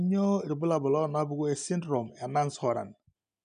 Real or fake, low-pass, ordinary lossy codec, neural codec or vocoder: real; 9.9 kHz; none; none